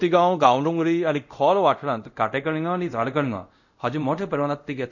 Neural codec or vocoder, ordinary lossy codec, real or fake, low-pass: codec, 24 kHz, 0.5 kbps, DualCodec; none; fake; 7.2 kHz